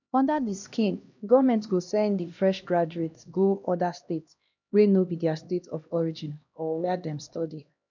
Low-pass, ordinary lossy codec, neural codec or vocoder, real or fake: 7.2 kHz; none; codec, 16 kHz, 1 kbps, X-Codec, HuBERT features, trained on LibriSpeech; fake